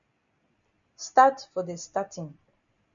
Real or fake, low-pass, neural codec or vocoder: real; 7.2 kHz; none